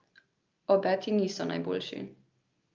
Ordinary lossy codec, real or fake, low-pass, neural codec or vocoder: Opus, 24 kbps; real; 7.2 kHz; none